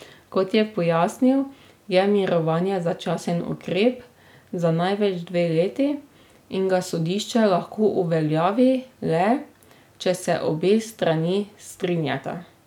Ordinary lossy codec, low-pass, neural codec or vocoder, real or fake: none; 19.8 kHz; none; real